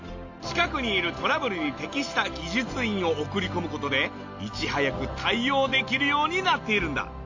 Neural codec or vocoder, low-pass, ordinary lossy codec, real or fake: none; 7.2 kHz; AAC, 48 kbps; real